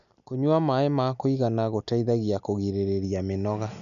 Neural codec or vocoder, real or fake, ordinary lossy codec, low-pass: none; real; none; 7.2 kHz